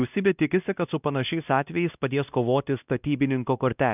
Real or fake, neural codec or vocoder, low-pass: fake; codec, 16 kHz, 1 kbps, X-Codec, WavLM features, trained on Multilingual LibriSpeech; 3.6 kHz